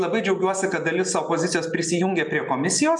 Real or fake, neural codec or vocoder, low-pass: real; none; 10.8 kHz